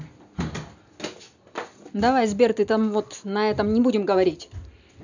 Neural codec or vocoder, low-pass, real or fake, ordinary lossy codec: none; 7.2 kHz; real; none